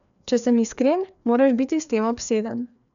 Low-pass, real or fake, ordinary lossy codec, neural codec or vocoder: 7.2 kHz; fake; none; codec, 16 kHz, 2 kbps, FreqCodec, larger model